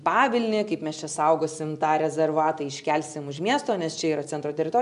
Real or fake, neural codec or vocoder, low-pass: real; none; 10.8 kHz